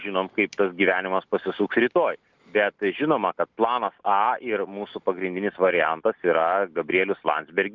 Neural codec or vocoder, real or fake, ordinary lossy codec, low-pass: none; real; Opus, 24 kbps; 7.2 kHz